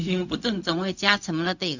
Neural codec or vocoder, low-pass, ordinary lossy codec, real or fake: codec, 16 kHz, 0.4 kbps, LongCat-Audio-Codec; 7.2 kHz; none; fake